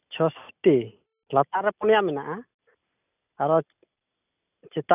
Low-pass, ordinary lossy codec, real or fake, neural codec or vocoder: 3.6 kHz; none; real; none